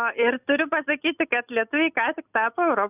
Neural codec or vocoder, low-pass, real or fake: none; 3.6 kHz; real